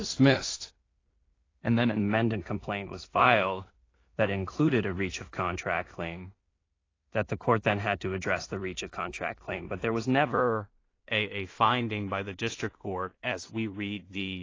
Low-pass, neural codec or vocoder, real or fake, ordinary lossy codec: 7.2 kHz; codec, 16 kHz in and 24 kHz out, 0.4 kbps, LongCat-Audio-Codec, two codebook decoder; fake; AAC, 32 kbps